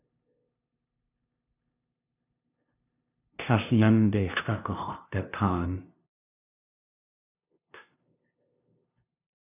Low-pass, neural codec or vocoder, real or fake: 3.6 kHz; codec, 16 kHz, 0.5 kbps, FunCodec, trained on LibriTTS, 25 frames a second; fake